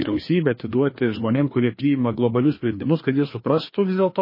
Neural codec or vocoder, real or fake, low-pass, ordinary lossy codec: codec, 16 kHz, 2 kbps, FreqCodec, larger model; fake; 5.4 kHz; MP3, 24 kbps